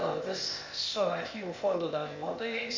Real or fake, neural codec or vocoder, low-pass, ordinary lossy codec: fake; codec, 16 kHz, 0.8 kbps, ZipCodec; 7.2 kHz; MP3, 64 kbps